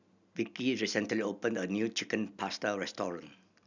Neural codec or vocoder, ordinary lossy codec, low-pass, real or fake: none; none; 7.2 kHz; real